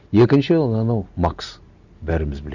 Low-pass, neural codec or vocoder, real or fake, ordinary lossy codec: 7.2 kHz; none; real; none